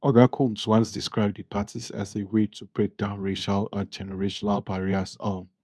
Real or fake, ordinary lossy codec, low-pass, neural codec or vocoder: fake; none; none; codec, 24 kHz, 0.9 kbps, WavTokenizer, medium speech release version 2